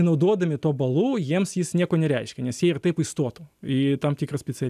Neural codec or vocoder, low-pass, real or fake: none; 14.4 kHz; real